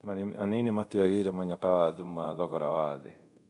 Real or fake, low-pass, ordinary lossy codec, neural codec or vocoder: fake; 10.8 kHz; none; codec, 24 kHz, 0.5 kbps, DualCodec